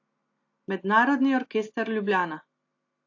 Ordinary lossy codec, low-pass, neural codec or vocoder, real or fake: AAC, 48 kbps; 7.2 kHz; none; real